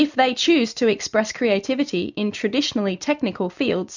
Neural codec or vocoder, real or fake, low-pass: none; real; 7.2 kHz